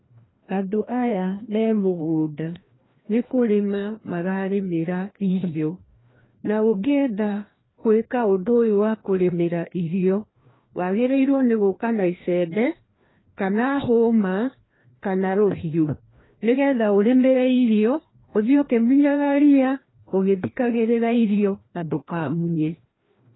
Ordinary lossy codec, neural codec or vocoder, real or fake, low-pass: AAC, 16 kbps; codec, 16 kHz, 1 kbps, FreqCodec, larger model; fake; 7.2 kHz